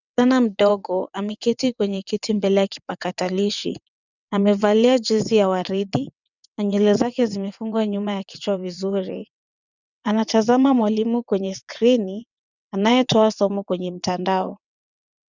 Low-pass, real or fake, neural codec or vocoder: 7.2 kHz; fake; vocoder, 22.05 kHz, 80 mel bands, WaveNeXt